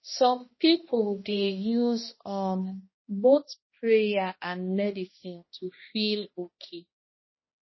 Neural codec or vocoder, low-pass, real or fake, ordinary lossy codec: codec, 16 kHz, 1 kbps, X-Codec, HuBERT features, trained on balanced general audio; 7.2 kHz; fake; MP3, 24 kbps